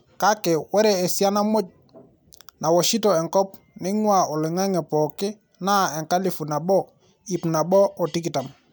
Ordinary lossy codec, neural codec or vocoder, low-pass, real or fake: none; none; none; real